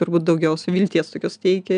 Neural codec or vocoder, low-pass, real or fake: none; 9.9 kHz; real